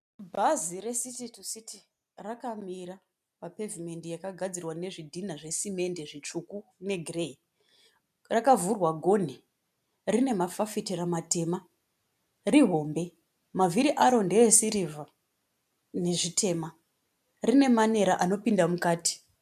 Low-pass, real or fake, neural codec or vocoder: 14.4 kHz; real; none